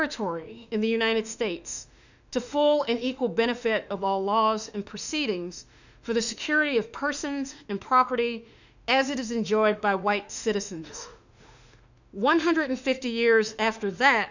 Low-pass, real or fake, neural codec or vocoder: 7.2 kHz; fake; autoencoder, 48 kHz, 32 numbers a frame, DAC-VAE, trained on Japanese speech